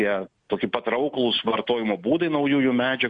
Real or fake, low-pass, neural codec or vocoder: real; 9.9 kHz; none